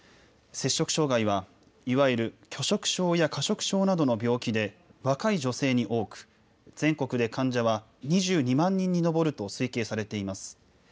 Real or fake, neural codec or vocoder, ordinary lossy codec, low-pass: real; none; none; none